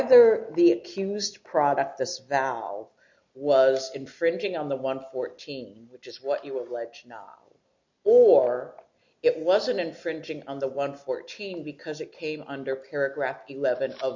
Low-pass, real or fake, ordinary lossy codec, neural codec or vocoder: 7.2 kHz; real; MP3, 48 kbps; none